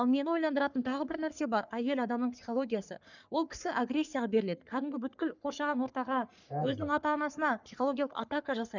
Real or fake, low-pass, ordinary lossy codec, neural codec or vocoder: fake; 7.2 kHz; none; codec, 44.1 kHz, 3.4 kbps, Pupu-Codec